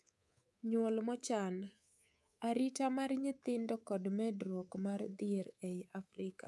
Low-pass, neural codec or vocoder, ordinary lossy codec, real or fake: none; codec, 24 kHz, 3.1 kbps, DualCodec; none; fake